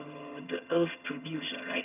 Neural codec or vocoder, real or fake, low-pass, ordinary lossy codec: vocoder, 22.05 kHz, 80 mel bands, HiFi-GAN; fake; 3.6 kHz; none